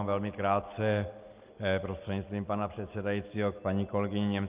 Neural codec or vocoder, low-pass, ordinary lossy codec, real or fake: none; 3.6 kHz; Opus, 32 kbps; real